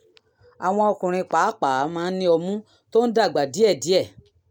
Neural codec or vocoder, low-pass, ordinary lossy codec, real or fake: none; 19.8 kHz; none; real